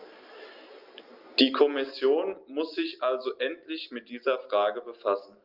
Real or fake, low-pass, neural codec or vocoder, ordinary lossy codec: real; 5.4 kHz; none; Opus, 32 kbps